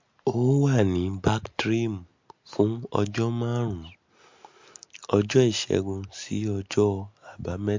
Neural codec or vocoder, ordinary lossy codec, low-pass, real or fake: none; MP3, 48 kbps; 7.2 kHz; real